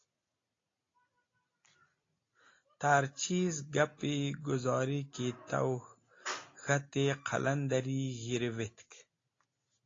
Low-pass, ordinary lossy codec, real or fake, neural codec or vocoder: 7.2 kHz; AAC, 32 kbps; real; none